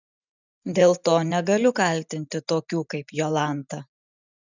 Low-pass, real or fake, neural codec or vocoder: 7.2 kHz; fake; vocoder, 44.1 kHz, 128 mel bands every 512 samples, BigVGAN v2